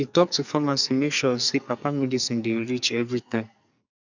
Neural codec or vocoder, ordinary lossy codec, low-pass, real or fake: codec, 44.1 kHz, 2.6 kbps, SNAC; none; 7.2 kHz; fake